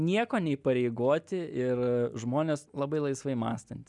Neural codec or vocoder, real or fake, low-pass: none; real; 10.8 kHz